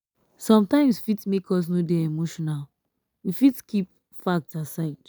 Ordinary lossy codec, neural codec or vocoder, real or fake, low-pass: none; none; real; none